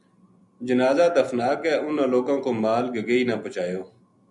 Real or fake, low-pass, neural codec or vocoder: real; 10.8 kHz; none